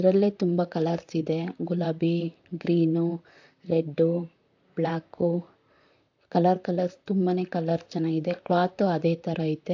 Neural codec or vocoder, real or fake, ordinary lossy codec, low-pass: vocoder, 44.1 kHz, 128 mel bands, Pupu-Vocoder; fake; AAC, 48 kbps; 7.2 kHz